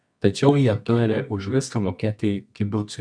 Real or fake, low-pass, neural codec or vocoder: fake; 9.9 kHz; codec, 24 kHz, 0.9 kbps, WavTokenizer, medium music audio release